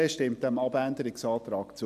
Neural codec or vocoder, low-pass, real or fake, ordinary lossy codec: none; 14.4 kHz; real; none